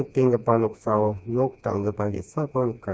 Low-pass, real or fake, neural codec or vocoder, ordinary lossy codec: none; fake; codec, 16 kHz, 2 kbps, FreqCodec, smaller model; none